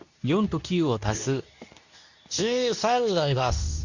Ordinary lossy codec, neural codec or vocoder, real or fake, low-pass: none; codec, 24 kHz, 0.9 kbps, WavTokenizer, medium speech release version 2; fake; 7.2 kHz